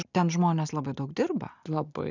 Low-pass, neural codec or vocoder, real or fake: 7.2 kHz; none; real